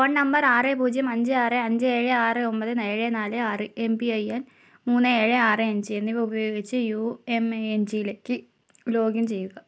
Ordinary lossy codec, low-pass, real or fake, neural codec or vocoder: none; none; real; none